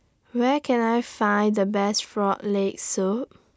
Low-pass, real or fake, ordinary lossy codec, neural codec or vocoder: none; real; none; none